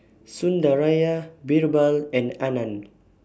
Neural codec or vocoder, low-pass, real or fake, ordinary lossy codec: none; none; real; none